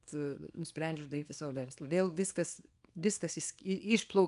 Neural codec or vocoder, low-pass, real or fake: codec, 24 kHz, 0.9 kbps, WavTokenizer, small release; 10.8 kHz; fake